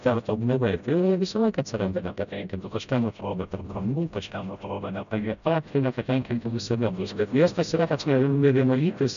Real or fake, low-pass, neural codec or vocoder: fake; 7.2 kHz; codec, 16 kHz, 0.5 kbps, FreqCodec, smaller model